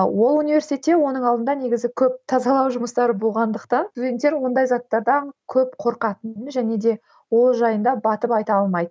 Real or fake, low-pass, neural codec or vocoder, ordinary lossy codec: real; none; none; none